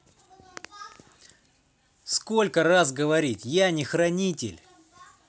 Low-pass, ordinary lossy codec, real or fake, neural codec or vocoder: none; none; real; none